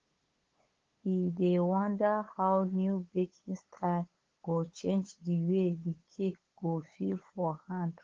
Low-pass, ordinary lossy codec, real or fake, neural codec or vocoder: 7.2 kHz; Opus, 16 kbps; fake; codec, 16 kHz, 8 kbps, FunCodec, trained on LibriTTS, 25 frames a second